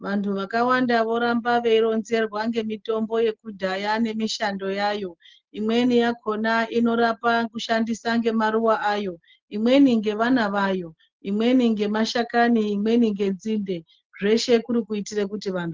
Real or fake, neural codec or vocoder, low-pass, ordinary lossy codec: real; none; 7.2 kHz; Opus, 16 kbps